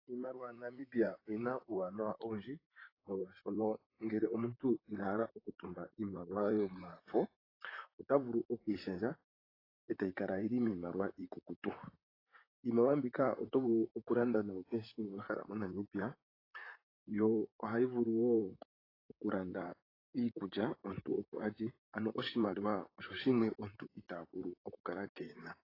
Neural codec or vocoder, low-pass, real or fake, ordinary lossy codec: vocoder, 44.1 kHz, 128 mel bands, Pupu-Vocoder; 5.4 kHz; fake; AAC, 24 kbps